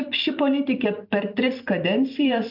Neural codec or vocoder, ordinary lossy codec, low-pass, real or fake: vocoder, 44.1 kHz, 128 mel bands every 256 samples, BigVGAN v2; MP3, 48 kbps; 5.4 kHz; fake